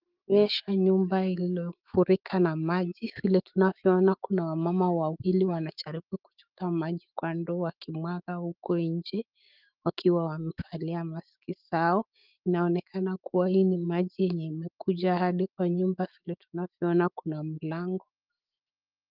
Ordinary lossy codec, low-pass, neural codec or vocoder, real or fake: Opus, 32 kbps; 5.4 kHz; autoencoder, 48 kHz, 128 numbers a frame, DAC-VAE, trained on Japanese speech; fake